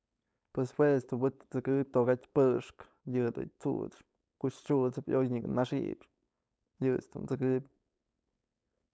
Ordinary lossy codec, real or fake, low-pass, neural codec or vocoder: none; fake; none; codec, 16 kHz, 4.8 kbps, FACodec